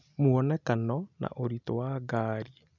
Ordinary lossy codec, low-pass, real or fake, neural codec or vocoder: none; 7.2 kHz; real; none